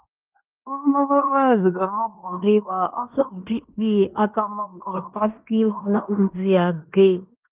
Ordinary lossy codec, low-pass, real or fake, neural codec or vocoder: Opus, 24 kbps; 3.6 kHz; fake; codec, 16 kHz in and 24 kHz out, 0.9 kbps, LongCat-Audio-Codec, fine tuned four codebook decoder